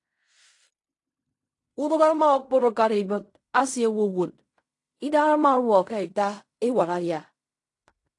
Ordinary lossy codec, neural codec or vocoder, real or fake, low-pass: AAC, 48 kbps; codec, 16 kHz in and 24 kHz out, 0.4 kbps, LongCat-Audio-Codec, fine tuned four codebook decoder; fake; 10.8 kHz